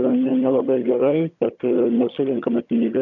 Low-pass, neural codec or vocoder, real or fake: 7.2 kHz; vocoder, 22.05 kHz, 80 mel bands, HiFi-GAN; fake